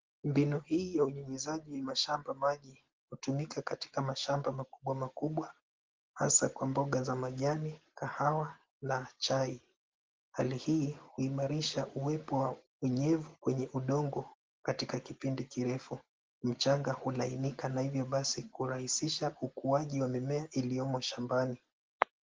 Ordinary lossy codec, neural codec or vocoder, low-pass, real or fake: Opus, 16 kbps; none; 7.2 kHz; real